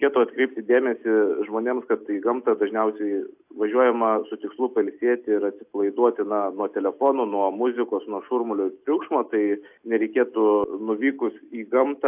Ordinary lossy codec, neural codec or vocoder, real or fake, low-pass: AAC, 32 kbps; none; real; 3.6 kHz